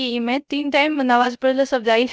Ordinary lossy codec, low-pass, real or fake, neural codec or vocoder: none; none; fake; codec, 16 kHz, 0.3 kbps, FocalCodec